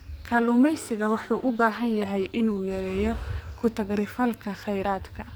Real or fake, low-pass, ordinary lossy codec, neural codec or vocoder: fake; none; none; codec, 44.1 kHz, 2.6 kbps, SNAC